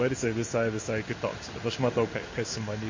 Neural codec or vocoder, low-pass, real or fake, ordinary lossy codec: codec, 16 kHz in and 24 kHz out, 1 kbps, XY-Tokenizer; 7.2 kHz; fake; MP3, 32 kbps